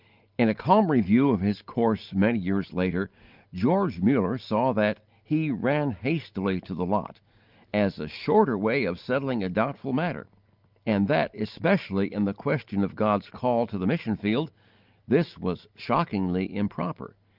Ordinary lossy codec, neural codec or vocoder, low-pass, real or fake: Opus, 32 kbps; none; 5.4 kHz; real